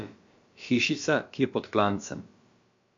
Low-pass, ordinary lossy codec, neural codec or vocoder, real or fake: 7.2 kHz; MP3, 48 kbps; codec, 16 kHz, about 1 kbps, DyCAST, with the encoder's durations; fake